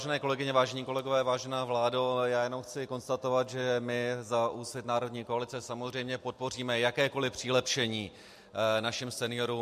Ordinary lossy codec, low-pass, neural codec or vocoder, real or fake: MP3, 64 kbps; 14.4 kHz; none; real